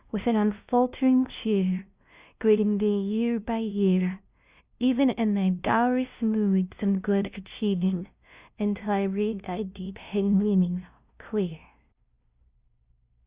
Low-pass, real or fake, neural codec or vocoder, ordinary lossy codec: 3.6 kHz; fake; codec, 16 kHz, 0.5 kbps, FunCodec, trained on LibriTTS, 25 frames a second; Opus, 64 kbps